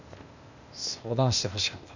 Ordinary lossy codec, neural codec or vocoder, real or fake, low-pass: none; codec, 16 kHz, 0.8 kbps, ZipCodec; fake; 7.2 kHz